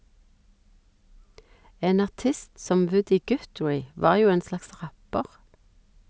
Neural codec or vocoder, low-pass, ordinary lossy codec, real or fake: none; none; none; real